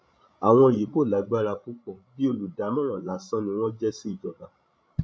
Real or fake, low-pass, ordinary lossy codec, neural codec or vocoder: fake; none; none; codec, 16 kHz, 16 kbps, FreqCodec, larger model